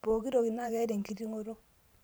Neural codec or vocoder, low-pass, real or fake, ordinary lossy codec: vocoder, 44.1 kHz, 128 mel bands every 512 samples, BigVGAN v2; none; fake; none